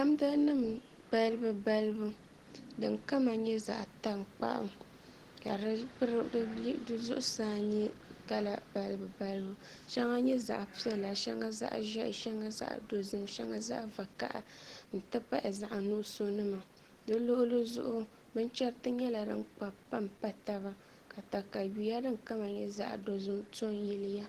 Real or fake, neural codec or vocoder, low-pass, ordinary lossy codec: real; none; 14.4 kHz; Opus, 16 kbps